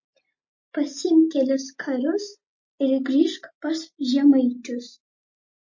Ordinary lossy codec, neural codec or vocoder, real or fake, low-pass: MP3, 32 kbps; none; real; 7.2 kHz